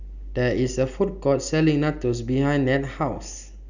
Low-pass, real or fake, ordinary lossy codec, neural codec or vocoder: 7.2 kHz; real; none; none